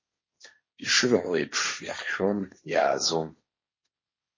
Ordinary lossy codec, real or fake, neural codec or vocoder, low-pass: MP3, 32 kbps; fake; codec, 16 kHz, 1.1 kbps, Voila-Tokenizer; 7.2 kHz